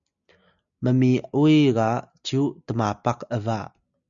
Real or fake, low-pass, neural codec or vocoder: real; 7.2 kHz; none